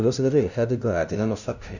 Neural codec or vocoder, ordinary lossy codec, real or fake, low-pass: codec, 16 kHz, 0.5 kbps, FunCodec, trained on LibriTTS, 25 frames a second; none; fake; 7.2 kHz